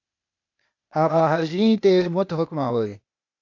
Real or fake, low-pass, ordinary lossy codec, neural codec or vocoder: fake; 7.2 kHz; MP3, 64 kbps; codec, 16 kHz, 0.8 kbps, ZipCodec